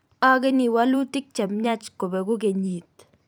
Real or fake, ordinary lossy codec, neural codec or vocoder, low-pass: fake; none; vocoder, 44.1 kHz, 128 mel bands every 512 samples, BigVGAN v2; none